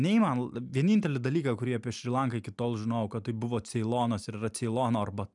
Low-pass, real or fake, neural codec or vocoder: 10.8 kHz; real; none